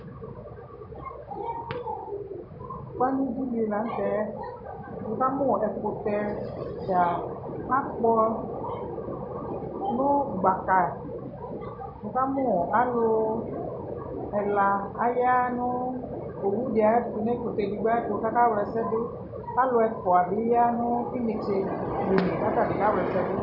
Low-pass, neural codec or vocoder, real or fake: 5.4 kHz; none; real